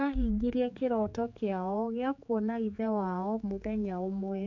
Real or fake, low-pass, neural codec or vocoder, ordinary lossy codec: fake; 7.2 kHz; codec, 16 kHz, 4 kbps, X-Codec, HuBERT features, trained on general audio; MP3, 48 kbps